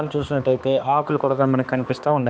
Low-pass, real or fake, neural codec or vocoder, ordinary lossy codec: none; fake; codec, 16 kHz, 2 kbps, X-Codec, HuBERT features, trained on balanced general audio; none